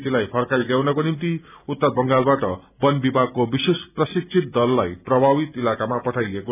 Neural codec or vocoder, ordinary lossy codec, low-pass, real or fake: none; none; 3.6 kHz; real